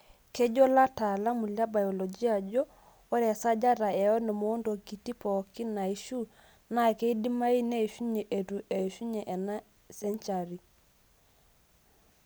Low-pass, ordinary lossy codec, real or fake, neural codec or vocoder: none; none; real; none